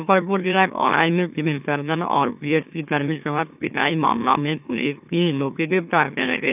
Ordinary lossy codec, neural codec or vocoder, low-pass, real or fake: none; autoencoder, 44.1 kHz, a latent of 192 numbers a frame, MeloTTS; 3.6 kHz; fake